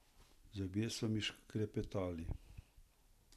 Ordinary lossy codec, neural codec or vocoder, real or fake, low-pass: none; none; real; 14.4 kHz